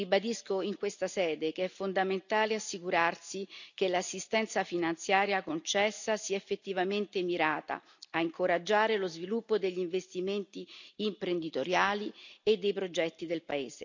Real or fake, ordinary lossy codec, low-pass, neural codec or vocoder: real; none; 7.2 kHz; none